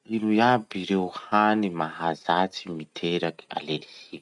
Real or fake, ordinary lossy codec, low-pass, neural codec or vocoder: real; none; 10.8 kHz; none